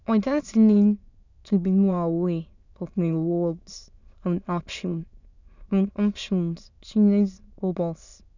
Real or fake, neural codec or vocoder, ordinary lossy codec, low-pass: fake; autoencoder, 22.05 kHz, a latent of 192 numbers a frame, VITS, trained on many speakers; none; 7.2 kHz